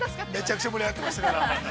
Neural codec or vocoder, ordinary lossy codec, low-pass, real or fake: none; none; none; real